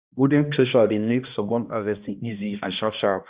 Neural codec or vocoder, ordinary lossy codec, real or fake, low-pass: codec, 16 kHz, 1 kbps, X-Codec, HuBERT features, trained on balanced general audio; Opus, 64 kbps; fake; 3.6 kHz